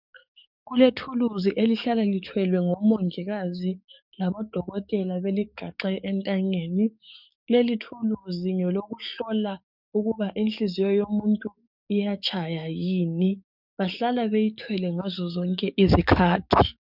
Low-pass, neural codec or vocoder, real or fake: 5.4 kHz; codec, 44.1 kHz, 7.8 kbps, DAC; fake